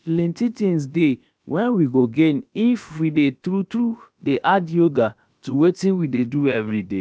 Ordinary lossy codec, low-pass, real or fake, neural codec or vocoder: none; none; fake; codec, 16 kHz, about 1 kbps, DyCAST, with the encoder's durations